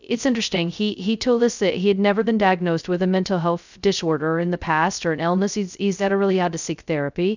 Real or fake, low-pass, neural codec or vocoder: fake; 7.2 kHz; codec, 16 kHz, 0.2 kbps, FocalCodec